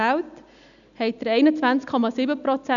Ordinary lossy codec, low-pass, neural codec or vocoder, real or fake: none; 7.2 kHz; none; real